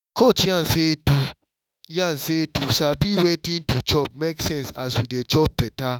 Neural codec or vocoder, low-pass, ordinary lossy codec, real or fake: autoencoder, 48 kHz, 32 numbers a frame, DAC-VAE, trained on Japanese speech; 19.8 kHz; none; fake